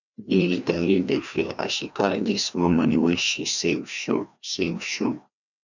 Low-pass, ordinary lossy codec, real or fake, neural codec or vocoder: 7.2 kHz; none; fake; codec, 16 kHz, 1 kbps, FreqCodec, larger model